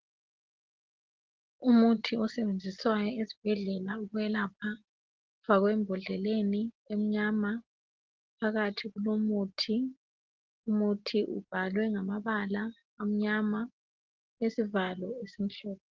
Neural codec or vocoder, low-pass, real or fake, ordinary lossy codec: none; 7.2 kHz; real; Opus, 16 kbps